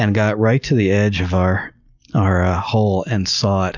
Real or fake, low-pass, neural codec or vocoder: real; 7.2 kHz; none